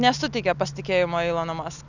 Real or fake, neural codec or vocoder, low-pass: real; none; 7.2 kHz